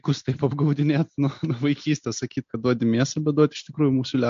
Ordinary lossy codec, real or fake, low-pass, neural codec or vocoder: MP3, 48 kbps; real; 7.2 kHz; none